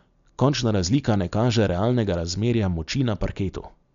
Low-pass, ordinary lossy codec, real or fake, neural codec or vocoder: 7.2 kHz; MP3, 64 kbps; real; none